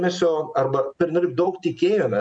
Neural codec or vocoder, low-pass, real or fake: none; 9.9 kHz; real